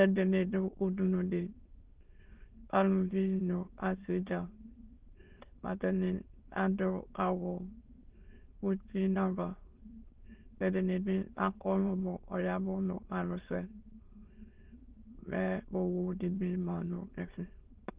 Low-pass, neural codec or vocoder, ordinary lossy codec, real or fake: 3.6 kHz; autoencoder, 22.05 kHz, a latent of 192 numbers a frame, VITS, trained on many speakers; Opus, 16 kbps; fake